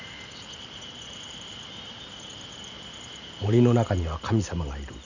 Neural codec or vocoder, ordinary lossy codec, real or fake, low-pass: vocoder, 44.1 kHz, 128 mel bands every 512 samples, BigVGAN v2; none; fake; 7.2 kHz